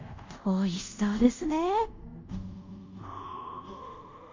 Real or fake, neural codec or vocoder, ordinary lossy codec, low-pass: fake; codec, 24 kHz, 0.5 kbps, DualCodec; MP3, 48 kbps; 7.2 kHz